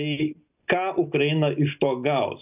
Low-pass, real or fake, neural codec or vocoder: 3.6 kHz; real; none